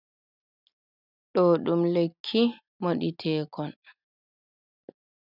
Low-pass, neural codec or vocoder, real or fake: 5.4 kHz; none; real